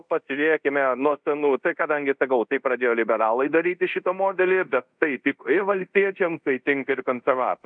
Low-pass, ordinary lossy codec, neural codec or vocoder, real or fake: 9.9 kHz; MP3, 64 kbps; codec, 24 kHz, 0.5 kbps, DualCodec; fake